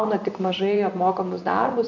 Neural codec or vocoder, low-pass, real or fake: vocoder, 24 kHz, 100 mel bands, Vocos; 7.2 kHz; fake